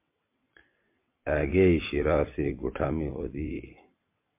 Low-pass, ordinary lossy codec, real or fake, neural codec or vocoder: 3.6 kHz; MP3, 24 kbps; fake; vocoder, 22.05 kHz, 80 mel bands, WaveNeXt